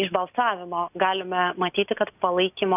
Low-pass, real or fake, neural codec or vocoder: 3.6 kHz; real; none